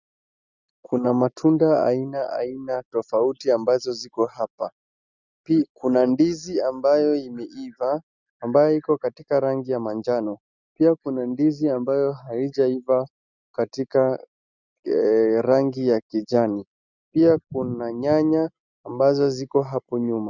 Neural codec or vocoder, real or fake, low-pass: none; real; 7.2 kHz